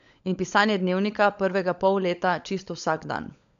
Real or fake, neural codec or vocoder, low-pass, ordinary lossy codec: fake; codec, 16 kHz, 16 kbps, FunCodec, trained on LibriTTS, 50 frames a second; 7.2 kHz; AAC, 64 kbps